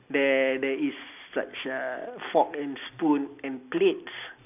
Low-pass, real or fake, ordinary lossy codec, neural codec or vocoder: 3.6 kHz; real; none; none